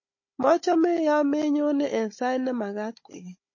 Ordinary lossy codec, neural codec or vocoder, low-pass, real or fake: MP3, 32 kbps; codec, 16 kHz, 16 kbps, FunCodec, trained on Chinese and English, 50 frames a second; 7.2 kHz; fake